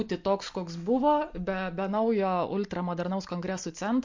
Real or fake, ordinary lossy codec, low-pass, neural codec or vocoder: real; MP3, 48 kbps; 7.2 kHz; none